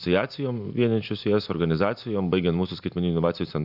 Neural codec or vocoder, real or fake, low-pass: none; real; 5.4 kHz